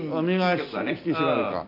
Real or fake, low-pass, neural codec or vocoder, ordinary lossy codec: real; 5.4 kHz; none; none